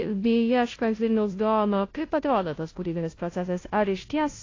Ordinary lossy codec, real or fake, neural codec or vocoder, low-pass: AAC, 32 kbps; fake; codec, 16 kHz, 0.5 kbps, FunCodec, trained on Chinese and English, 25 frames a second; 7.2 kHz